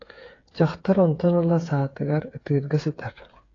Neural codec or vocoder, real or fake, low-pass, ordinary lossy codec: codec, 16 kHz, 8 kbps, FreqCodec, smaller model; fake; 7.2 kHz; AAC, 32 kbps